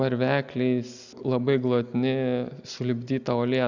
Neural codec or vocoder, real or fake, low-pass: none; real; 7.2 kHz